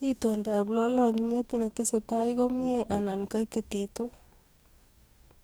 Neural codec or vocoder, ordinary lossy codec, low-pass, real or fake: codec, 44.1 kHz, 2.6 kbps, DAC; none; none; fake